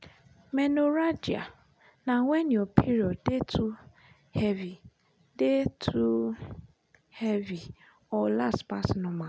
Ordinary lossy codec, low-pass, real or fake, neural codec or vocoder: none; none; real; none